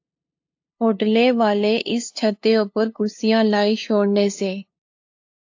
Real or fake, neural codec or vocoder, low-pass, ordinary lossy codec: fake; codec, 16 kHz, 2 kbps, FunCodec, trained on LibriTTS, 25 frames a second; 7.2 kHz; AAC, 48 kbps